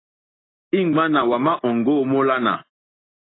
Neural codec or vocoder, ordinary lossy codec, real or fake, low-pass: none; AAC, 16 kbps; real; 7.2 kHz